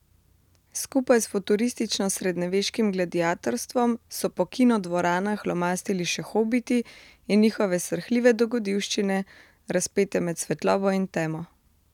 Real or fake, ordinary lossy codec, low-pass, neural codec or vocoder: real; none; 19.8 kHz; none